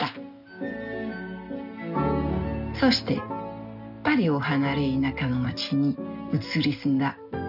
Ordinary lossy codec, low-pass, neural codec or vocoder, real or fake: none; 5.4 kHz; none; real